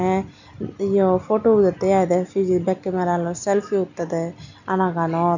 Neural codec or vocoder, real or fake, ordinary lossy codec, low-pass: none; real; none; 7.2 kHz